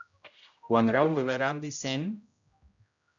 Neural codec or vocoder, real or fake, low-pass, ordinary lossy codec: codec, 16 kHz, 0.5 kbps, X-Codec, HuBERT features, trained on general audio; fake; 7.2 kHz; AAC, 64 kbps